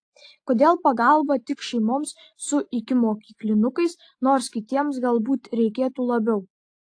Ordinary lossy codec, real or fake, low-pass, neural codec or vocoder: AAC, 48 kbps; real; 9.9 kHz; none